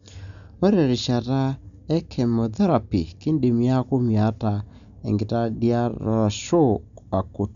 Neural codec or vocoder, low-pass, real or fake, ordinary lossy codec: none; 7.2 kHz; real; none